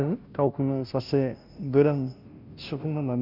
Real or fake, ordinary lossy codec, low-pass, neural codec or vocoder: fake; none; 5.4 kHz; codec, 16 kHz, 0.5 kbps, FunCodec, trained on Chinese and English, 25 frames a second